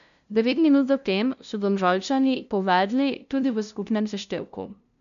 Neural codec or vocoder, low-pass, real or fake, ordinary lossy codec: codec, 16 kHz, 0.5 kbps, FunCodec, trained on LibriTTS, 25 frames a second; 7.2 kHz; fake; none